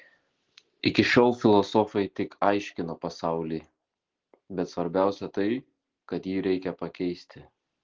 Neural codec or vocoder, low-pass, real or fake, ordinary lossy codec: none; 7.2 kHz; real; Opus, 16 kbps